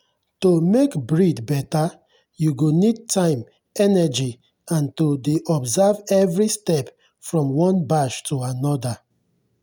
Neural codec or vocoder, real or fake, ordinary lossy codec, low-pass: none; real; none; none